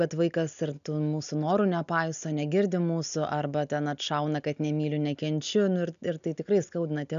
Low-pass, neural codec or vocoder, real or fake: 7.2 kHz; none; real